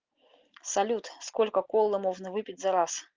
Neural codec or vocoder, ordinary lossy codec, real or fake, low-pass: none; Opus, 24 kbps; real; 7.2 kHz